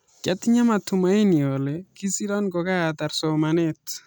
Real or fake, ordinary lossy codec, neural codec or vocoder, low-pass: real; none; none; none